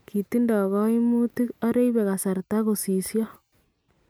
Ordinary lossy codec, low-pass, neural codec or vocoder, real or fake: none; none; none; real